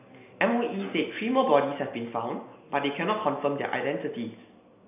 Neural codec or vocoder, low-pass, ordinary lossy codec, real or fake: none; 3.6 kHz; none; real